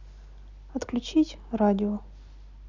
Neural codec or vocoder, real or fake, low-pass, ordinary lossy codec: none; real; 7.2 kHz; none